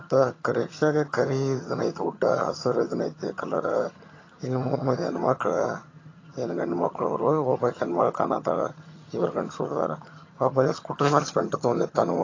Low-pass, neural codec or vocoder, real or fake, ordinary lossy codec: 7.2 kHz; vocoder, 22.05 kHz, 80 mel bands, HiFi-GAN; fake; AAC, 32 kbps